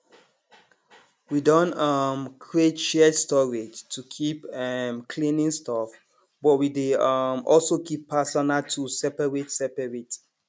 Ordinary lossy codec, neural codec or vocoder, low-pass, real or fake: none; none; none; real